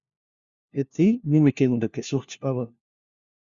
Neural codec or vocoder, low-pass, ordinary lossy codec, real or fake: codec, 16 kHz, 1 kbps, FunCodec, trained on LibriTTS, 50 frames a second; 7.2 kHz; Opus, 64 kbps; fake